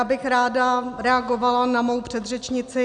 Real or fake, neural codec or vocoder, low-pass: real; none; 9.9 kHz